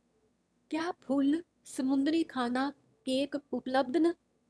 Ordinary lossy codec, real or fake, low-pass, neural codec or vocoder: none; fake; none; autoencoder, 22.05 kHz, a latent of 192 numbers a frame, VITS, trained on one speaker